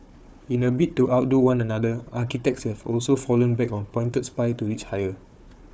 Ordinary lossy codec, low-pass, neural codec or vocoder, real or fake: none; none; codec, 16 kHz, 4 kbps, FunCodec, trained on Chinese and English, 50 frames a second; fake